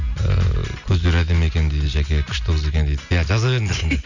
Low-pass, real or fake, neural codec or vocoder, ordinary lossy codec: 7.2 kHz; real; none; none